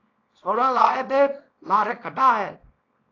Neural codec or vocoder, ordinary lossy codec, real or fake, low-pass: codec, 24 kHz, 0.9 kbps, WavTokenizer, small release; AAC, 32 kbps; fake; 7.2 kHz